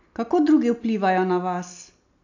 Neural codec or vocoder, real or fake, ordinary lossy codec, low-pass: none; real; AAC, 48 kbps; 7.2 kHz